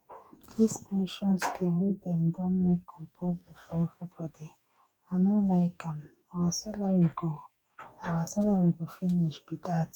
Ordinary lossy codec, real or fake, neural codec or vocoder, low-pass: none; fake; codec, 44.1 kHz, 2.6 kbps, DAC; 19.8 kHz